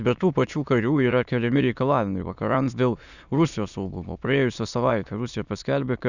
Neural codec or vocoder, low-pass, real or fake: autoencoder, 22.05 kHz, a latent of 192 numbers a frame, VITS, trained on many speakers; 7.2 kHz; fake